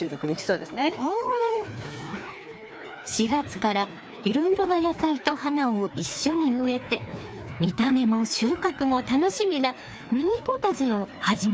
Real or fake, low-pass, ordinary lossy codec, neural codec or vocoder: fake; none; none; codec, 16 kHz, 2 kbps, FreqCodec, larger model